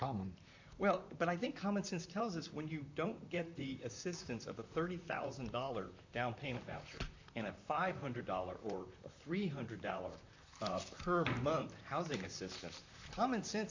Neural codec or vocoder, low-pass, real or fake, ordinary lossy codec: vocoder, 44.1 kHz, 128 mel bands, Pupu-Vocoder; 7.2 kHz; fake; AAC, 48 kbps